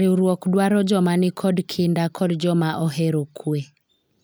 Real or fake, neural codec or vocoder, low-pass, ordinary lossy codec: real; none; none; none